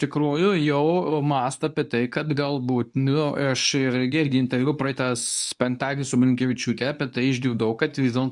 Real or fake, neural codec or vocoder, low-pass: fake; codec, 24 kHz, 0.9 kbps, WavTokenizer, medium speech release version 2; 10.8 kHz